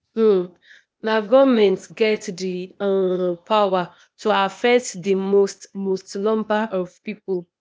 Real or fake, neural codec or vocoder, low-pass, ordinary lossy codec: fake; codec, 16 kHz, 0.8 kbps, ZipCodec; none; none